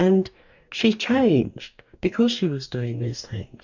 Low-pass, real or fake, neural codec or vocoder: 7.2 kHz; fake; codec, 44.1 kHz, 2.6 kbps, DAC